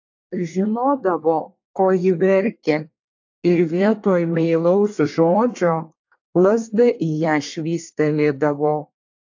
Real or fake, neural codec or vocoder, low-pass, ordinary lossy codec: fake; codec, 24 kHz, 1 kbps, SNAC; 7.2 kHz; AAC, 48 kbps